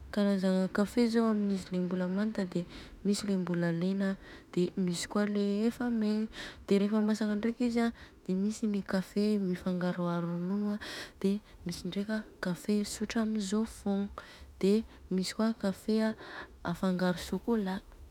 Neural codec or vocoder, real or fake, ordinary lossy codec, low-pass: autoencoder, 48 kHz, 32 numbers a frame, DAC-VAE, trained on Japanese speech; fake; none; 19.8 kHz